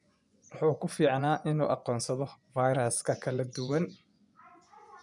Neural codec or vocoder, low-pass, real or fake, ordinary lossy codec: vocoder, 24 kHz, 100 mel bands, Vocos; 10.8 kHz; fake; none